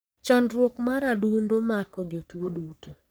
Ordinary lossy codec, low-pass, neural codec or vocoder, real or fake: none; none; codec, 44.1 kHz, 3.4 kbps, Pupu-Codec; fake